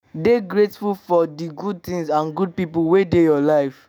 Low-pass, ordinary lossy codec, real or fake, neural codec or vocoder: none; none; fake; autoencoder, 48 kHz, 128 numbers a frame, DAC-VAE, trained on Japanese speech